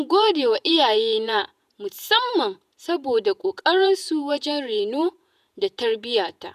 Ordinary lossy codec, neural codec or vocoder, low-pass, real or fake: none; vocoder, 48 kHz, 128 mel bands, Vocos; 14.4 kHz; fake